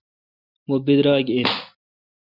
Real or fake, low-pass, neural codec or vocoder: real; 5.4 kHz; none